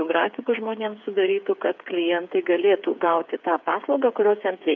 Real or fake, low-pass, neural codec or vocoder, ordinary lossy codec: fake; 7.2 kHz; codec, 16 kHz, 8 kbps, FreqCodec, smaller model; MP3, 64 kbps